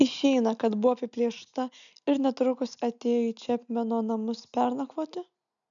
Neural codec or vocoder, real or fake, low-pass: none; real; 7.2 kHz